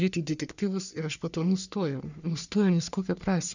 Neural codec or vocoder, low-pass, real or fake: codec, 44.1 kHz, 3.4 kbps, Pupu-Codec; 7.2 kHz; fake